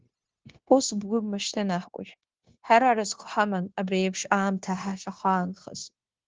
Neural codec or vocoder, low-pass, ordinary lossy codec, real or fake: codec, 16 kHz, 0.9 kbps, LongCat-Audio-Codec; 7.2 kHz; Opus, 16 kbps; fake